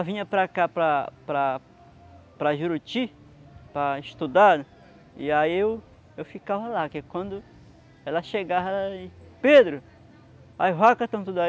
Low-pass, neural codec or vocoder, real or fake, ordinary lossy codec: none; none; real; none